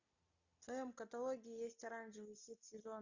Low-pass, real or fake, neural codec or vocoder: 7.2 kHz; real; none